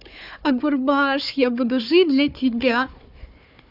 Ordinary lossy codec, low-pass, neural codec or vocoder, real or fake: none; 5.4 kHz; codec, 16 kHz, 4 kbps, FunCodec, trained on Chinese and English, 50 frames a second; fake